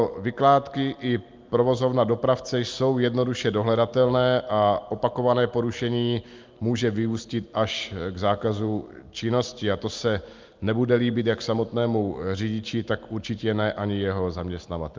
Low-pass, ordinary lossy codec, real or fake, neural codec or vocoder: 7.2 kHz; Opus, 24 kbps; real; none